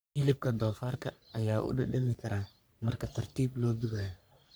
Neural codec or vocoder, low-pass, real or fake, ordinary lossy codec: codec, 44.1 kHz, 3.4 kbps, Pupu-Codec; none; fake; none